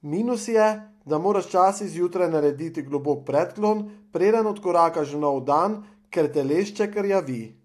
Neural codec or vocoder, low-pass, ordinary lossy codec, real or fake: none; 14.4 kHz; AAC, 64 kbps; real